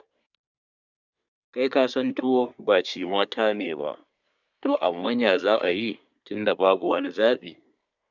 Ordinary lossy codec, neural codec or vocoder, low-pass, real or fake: none; codec, 24 kHz, 1 kbps, SNAC; 7.2 kHz; fake